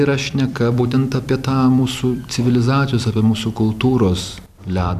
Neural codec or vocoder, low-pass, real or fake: vocoder, 44.1 kHz, 128 mel bands every 256 samples, BigVGAN v2; 14.4 kHz; fake